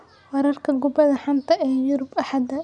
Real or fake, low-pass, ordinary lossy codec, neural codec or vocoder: real; 9.9 kHz; none; none